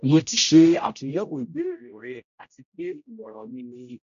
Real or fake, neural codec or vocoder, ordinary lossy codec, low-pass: fake; codec, 16 kHz, 0.5 kbps, X-Codec, HuBERT features, trained on general audio; none; 7.2 kHz